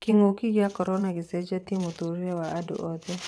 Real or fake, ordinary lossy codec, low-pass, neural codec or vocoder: fake; none; none; vocoder, 22.05 kHz, 80 mel bands, WaveNeXt